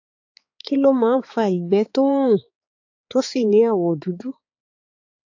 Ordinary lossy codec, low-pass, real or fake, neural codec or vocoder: AAC, 48 kbps; 7.2 kHz; fake; codec, 16 kHz, 4 kbps, X-Codec, HuBERT features, trained on balanced general audio